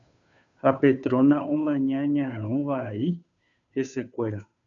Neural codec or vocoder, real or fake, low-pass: codec, 16 kHz, 2 kbps, FunCodec, trained on Chinese and English, 25 frames a second; fake; 7.2 kHz